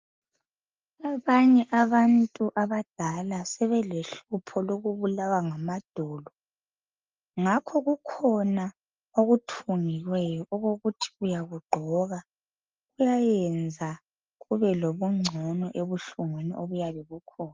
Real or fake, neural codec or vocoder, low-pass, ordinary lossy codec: real; none; 7.2 kHz; Opus, 24 kbps